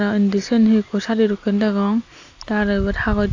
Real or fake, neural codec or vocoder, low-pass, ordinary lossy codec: real; none; 7.2 kHz; AAC, 48 kbps